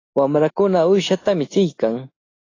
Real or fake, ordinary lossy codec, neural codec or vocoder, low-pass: real; AAC, 32 kbps; none; 7.2 kHz